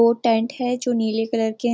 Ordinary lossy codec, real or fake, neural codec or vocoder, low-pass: none; real; none; none